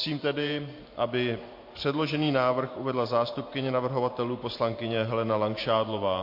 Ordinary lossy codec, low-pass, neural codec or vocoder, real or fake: MP3, 32 kbps; 5.4 kHz; vocoder, 44.1 kHz, 128 mel bands every 512 samples, BigVGAN v2; fake